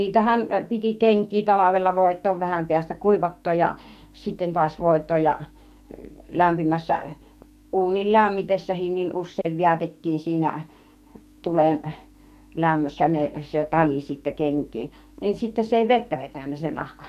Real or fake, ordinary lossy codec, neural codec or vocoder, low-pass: fake; none; codec, 44.1 kHz, 2.6 kbps, SNAC; 14.4 kHz